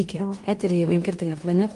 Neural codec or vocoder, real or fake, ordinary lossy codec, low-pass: codec, 16 kHz in and 24 kHz out, 0.9 kbps, LongCat-Audio-Codec, fine tuned four codebook decoder; fake; Opus, 32 kbps; 10.8 kHz